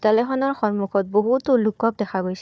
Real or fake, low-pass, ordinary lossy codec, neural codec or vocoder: fake; none; none; codec, 16 kHz, 4 kbps, FunCodec, trained on Chinese and English, 50 frames a second